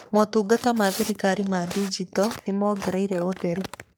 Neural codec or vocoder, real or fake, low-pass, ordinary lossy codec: codec, 44.1 kHz, 3.4 kbps, Pupu-Codec; fake; none; none